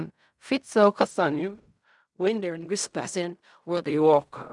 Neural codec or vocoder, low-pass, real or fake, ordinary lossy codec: codec, 16 kHz in and 24 kHz out, 0.4 kbps, LongCat-Audio-Codec, fine tuned four codebook decoder; 10.8 kHz; fake; none